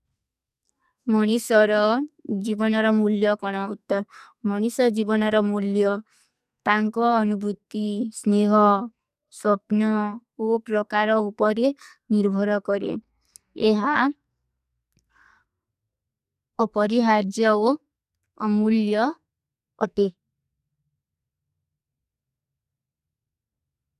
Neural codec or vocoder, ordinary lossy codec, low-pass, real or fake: codec, 44.1 kHz, 2.6 kbps, SNAC; none; 14.4 kHz; fake